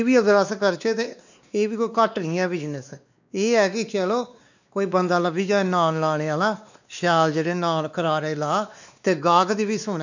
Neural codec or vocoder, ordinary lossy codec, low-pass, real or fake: codec, 16 kHz, 2 kbps, X-Codec, WavLM features, trained on Multilingual LibriSpeech; none; 7.2 kHz; fake